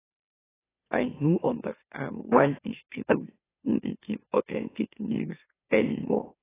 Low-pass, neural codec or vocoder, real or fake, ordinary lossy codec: 3.6 kHz; autoencoder, 44.1 kHz, a latent of 192 numbers a frame, MeloTTS; fake; AAC, 16 kbps